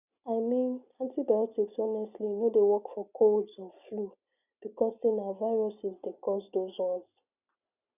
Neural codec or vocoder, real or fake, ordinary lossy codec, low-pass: none; real; none; 3.6 kHz